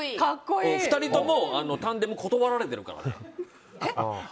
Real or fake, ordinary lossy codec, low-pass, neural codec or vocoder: real; none; none; none